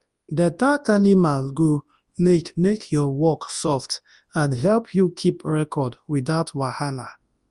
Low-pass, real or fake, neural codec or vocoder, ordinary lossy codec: 10.8 kHz; fake; codec, 24 kHz, 0.9 kbps, WavTokenizer, large speech release; Opus, 32 kbps